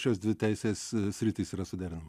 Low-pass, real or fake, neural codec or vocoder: 14.4 kHz; real; none